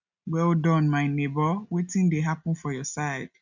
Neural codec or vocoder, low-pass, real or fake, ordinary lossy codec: none; 7.2 kHz; real; none